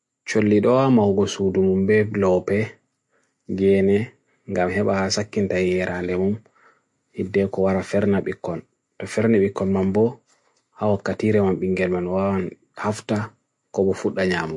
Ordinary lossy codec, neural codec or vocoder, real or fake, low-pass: MP3, 48 kbps; none; real; 10.8 kHz